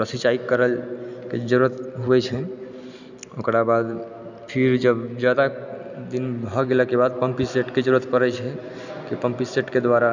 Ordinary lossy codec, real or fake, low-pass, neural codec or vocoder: none; real; 7.2 kHz; none